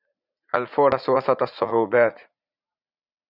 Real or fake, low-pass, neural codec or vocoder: fake; 5.4 kHz; vocoder, 44.1 kHz, 80 mel bands, Vocos